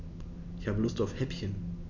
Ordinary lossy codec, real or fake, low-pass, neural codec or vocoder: none; real; 7.2 kHz; none